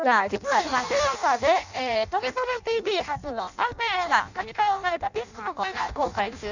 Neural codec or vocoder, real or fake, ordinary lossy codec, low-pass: codec, 16 kHz in and 24 kHz out, 0.6 kbps, FireRedTTS-2 codec; fake; none; 7.2 kHz